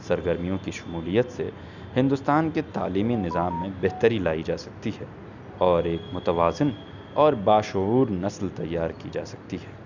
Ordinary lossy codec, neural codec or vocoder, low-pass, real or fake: none; none; 7.2 kHz; real